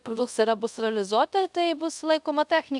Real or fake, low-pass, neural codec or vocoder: fake; 10.8 kHz; codec, 24 kHz, 0.5 kbps, DualCodec